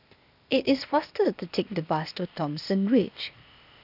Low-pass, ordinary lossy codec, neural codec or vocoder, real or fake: 5.4 kHz; none; codec, 16 kHz, 0.8 kbps, ZipCodec; fake